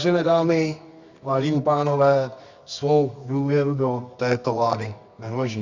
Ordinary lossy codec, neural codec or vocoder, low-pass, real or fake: Opus, 64 kbps; codec, 24 kHz, 0.9 kbps, WavTokenizer, medium music audio release; 7.2 kHz; fake